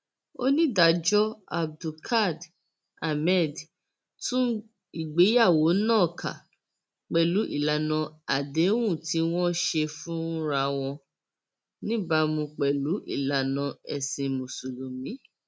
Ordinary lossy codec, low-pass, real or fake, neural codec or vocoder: none; none; real; none